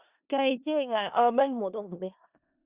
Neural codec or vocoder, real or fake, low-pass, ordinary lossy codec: codec, 16 kHz in and 24 kHz out, 0.4 kbps, LongCat-Audio-Codec, four codebook decoder; fake; 3.6 kHz; Opus, 64 kbps